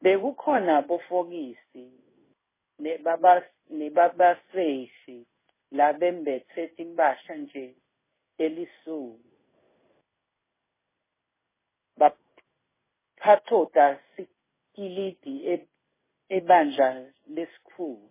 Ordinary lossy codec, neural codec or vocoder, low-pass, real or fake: MP3, 16 kbps; codec, 16 kHz in and 24 kHz out, 1 kbps, XY-Tokenizer; 3.6 kHz; fake